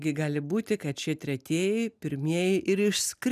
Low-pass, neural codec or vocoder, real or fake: 14.4 kHz; none; real